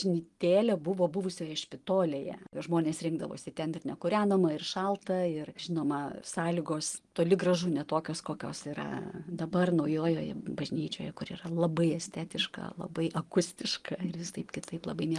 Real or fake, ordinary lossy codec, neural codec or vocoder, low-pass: real; Opus, 24 kbps; none; 10.8 kHz